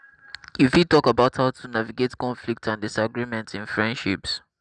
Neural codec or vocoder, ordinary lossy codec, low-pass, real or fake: none; none; 9.9 kHz; real